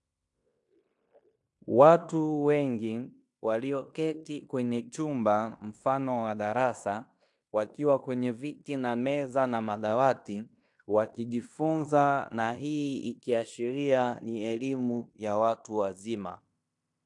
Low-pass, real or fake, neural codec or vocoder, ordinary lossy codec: 10.8 kHz; fake; codec, 16 kHz in and 24 kHz out, 0.9 kbps, LongCat-Audio-Codec, fine tuned four codebook decoder; AAC, 64 kbps